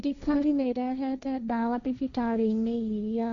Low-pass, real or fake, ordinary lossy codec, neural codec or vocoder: 7.2 kHz; fake; none; codec, 16 kHz, 1.1 kbps, Voila-Tokenizer